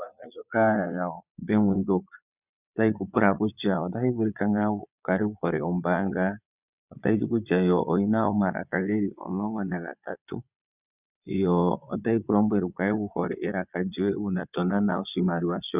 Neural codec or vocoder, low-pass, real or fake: vocoder, 22.05 kHz, 80 mel bands, Vocos; 3.6 kHz; fake